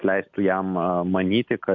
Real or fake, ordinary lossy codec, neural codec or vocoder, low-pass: real; MP3, 48 kbps; none; 7.2 kHz